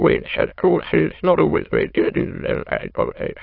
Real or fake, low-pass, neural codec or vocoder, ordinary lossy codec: fake; 5.4 kHz; autoencoder, 22.05 kHz, a latent of 192 numbers a frame, VITS, trained on many speakers; AAC, 32 kbps